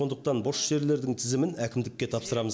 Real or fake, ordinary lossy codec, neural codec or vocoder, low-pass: real; none; none; none